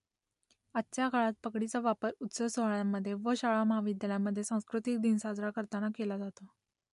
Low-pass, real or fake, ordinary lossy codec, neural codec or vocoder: 10.8 kHz; real; MP3, 64 kbps; none